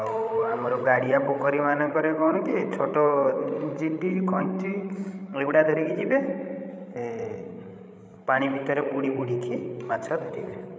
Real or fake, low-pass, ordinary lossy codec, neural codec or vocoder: fake; none; none; codec, 16 kHz, 16 kbps, FreqCodec, larger model